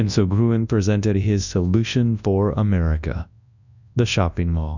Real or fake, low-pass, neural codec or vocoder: fake; 7.2 kHz; codec, 24 kHz, 0.9 kbps, WavTokenizer, large speech release